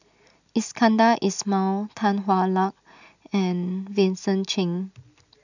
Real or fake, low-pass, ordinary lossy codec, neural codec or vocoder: real; 7.2 kHz; none; none